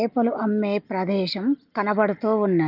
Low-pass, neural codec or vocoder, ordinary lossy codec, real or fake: 5.4 kHz; none; Opus, 24 kbps; real